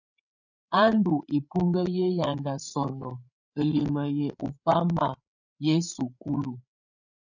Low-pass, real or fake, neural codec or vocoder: 7.2 kHz; fake; codec, 16 kHz, 8 kbps, FreqCodec, larger model